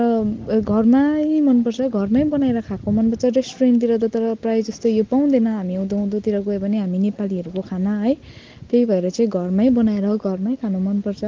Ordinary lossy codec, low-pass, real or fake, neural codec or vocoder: Opus, 16 kbps; 7.2 kHz; real; none